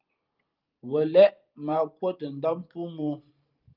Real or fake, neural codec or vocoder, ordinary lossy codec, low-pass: fake; vocoder, 44.1 kHz, 128 mel bands every 512 samples, BigVGAN v2; Opus, 32 kbps; 5.4 kHz